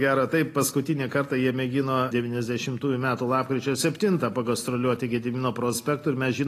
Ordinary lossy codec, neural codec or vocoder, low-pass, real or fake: AAC, 48 kbps; none; 14.4 kHz; real